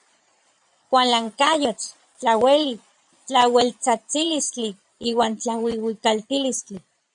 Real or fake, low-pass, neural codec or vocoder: fake; 9.9 kHz; vocoder, 22.05 kHz, 80 mel bands, Vocos